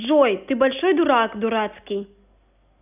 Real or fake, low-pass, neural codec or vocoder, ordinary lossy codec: real; 3.6 kHz; none; none